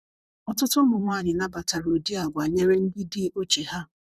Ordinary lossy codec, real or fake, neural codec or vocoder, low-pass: Opus, 64 kbps; fake; vocoder, 44.1 kHz, 128 mel bands, Pupu-Vocoder; 14.4 kHz